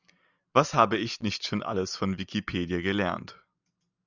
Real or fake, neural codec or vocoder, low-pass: real; none; 7.2 kHz